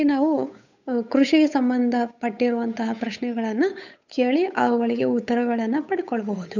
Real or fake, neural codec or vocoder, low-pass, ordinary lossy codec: fake; codec, 16 kHz, 8 kbps, FunCodec, trained on Chinese and English, 25 frames a second; 7.2 kHz; none